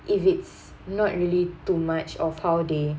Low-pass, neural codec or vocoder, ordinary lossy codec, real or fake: none; none; none; real